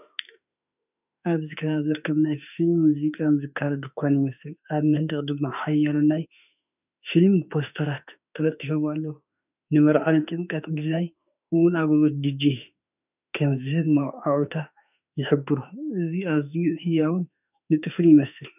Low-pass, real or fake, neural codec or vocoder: 3.6 kHz; fake; autoencoder, 48 kHz, 32 numbers a frame, DAC-VAE, trained on Japanese speech